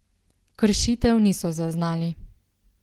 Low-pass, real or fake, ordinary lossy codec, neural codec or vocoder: 19.8 kHz; real; Opus, 16 kbps; none